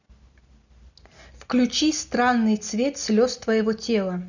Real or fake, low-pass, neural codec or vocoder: real; 7.2 kHz; none